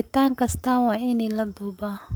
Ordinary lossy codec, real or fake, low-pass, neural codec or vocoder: none; fake; none; codec, 44.1 kHz, 7.8 kbps, Pupu-Codec